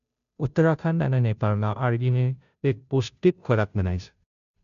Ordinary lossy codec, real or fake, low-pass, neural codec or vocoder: none; fake; 7.2 kHz; codec, 16 kHz, 0.5 kbps, FunCodec, trained on Chinese and English, 25 frames a second